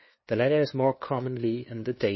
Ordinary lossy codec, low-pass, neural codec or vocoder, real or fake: MP3, 24 kbps; 7.2 kHz; codec, 16 kHz, 2 kbps, FunCodec, trained on LibriTTS, 25 frames a second; fake